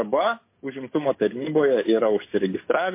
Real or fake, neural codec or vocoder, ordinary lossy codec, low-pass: fake; codec, 44.1 kHz, 7.8 kbps, DAC; MP3, 24 kbps; 3.6 kHz